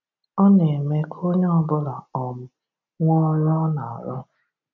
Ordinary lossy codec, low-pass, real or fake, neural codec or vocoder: none; 7.2 kHz; real; none